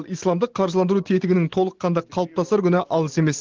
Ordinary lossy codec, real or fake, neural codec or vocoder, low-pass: Opus, 16 kbps; real; none; 7.2 kHz